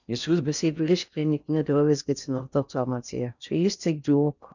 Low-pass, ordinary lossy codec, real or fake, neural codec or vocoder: 7.2 kHz; none; fake; codec, 16 kHz in and 24 kHz out, 0.6 kbps, FocalCodec, streaming, 4096 codes